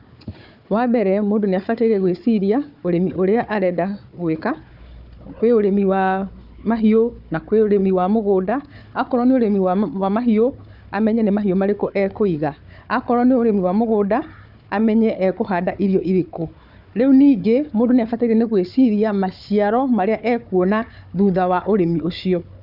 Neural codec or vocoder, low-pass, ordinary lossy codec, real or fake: codec, 16 kHz, 4 kbps, FunCodec, trained on Chinese and English, 50 frames a second; 5.4 kHz; none; fake